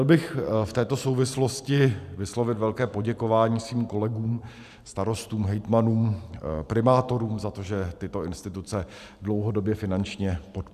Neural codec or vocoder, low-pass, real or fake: vocoder, 44.1 kHz, 128 mel bands every 512 samples, BigVGAN v2; 14.4 kHz; fake